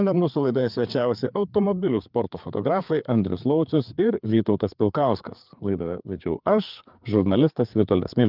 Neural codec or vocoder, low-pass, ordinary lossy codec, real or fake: codec, 16 kHz, 4 kbps, FreqCodec, larger model; 5.4 kHz; Opus, 24 kbps; fake